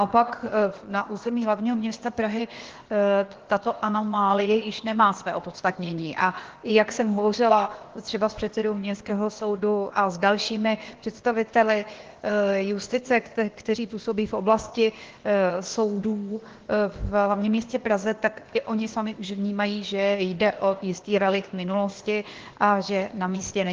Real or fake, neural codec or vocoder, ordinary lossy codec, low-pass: fake; codec, 16 kHz, 0.8 kbps, ZipCodec; Opus, 16 kbps; 7.2 kHz